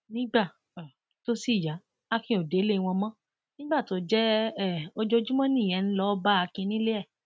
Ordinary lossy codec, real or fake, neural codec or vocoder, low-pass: none; real; none; none